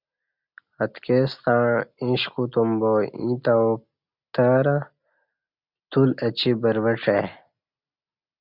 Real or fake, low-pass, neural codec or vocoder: real; 5.4 kHz; none